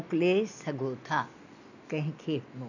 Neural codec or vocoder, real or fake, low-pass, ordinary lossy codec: none; real; 7.2 kHz; none